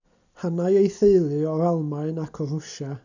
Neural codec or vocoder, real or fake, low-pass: none; real; 7.2 kHz